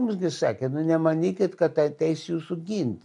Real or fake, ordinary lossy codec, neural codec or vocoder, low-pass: real; MP3, 64 kbps; none; 10.8 kHz